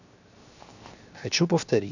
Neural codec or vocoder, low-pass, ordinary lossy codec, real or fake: codec, 16 kHz, 0.7 kbps, FocalCodec; 7.2 kHz; none; fake